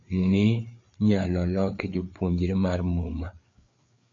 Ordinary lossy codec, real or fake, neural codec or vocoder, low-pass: MP3, 48 kbps; fake; codec, 16 kHz, 4 kbps, FreqCodec, larger model; 7.2 kHz